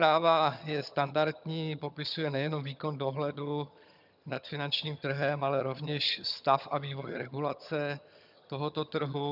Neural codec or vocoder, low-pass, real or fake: vocoder, 22.05 kHz, 80 mel bands, HiFi-GAN; 5.4 kHz; fake